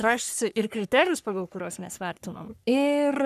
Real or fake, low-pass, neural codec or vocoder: fake; 14.4 kHz; codec, 44.1 kHz, 3.4 kbps, Pupu-Codec